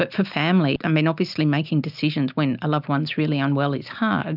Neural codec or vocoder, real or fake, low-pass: none; real; 5.4 kHz